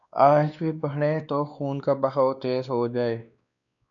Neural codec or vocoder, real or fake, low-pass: codec, 16 kHz, 2 kbps, X-Codec, WavLM features, trained on Multilingual LibriSpeech; fake; 7.2 kHz